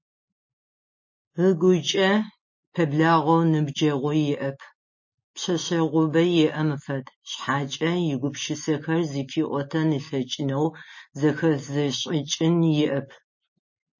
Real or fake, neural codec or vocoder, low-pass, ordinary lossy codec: real; none; 7.2 kHz; MP3, 32 kbps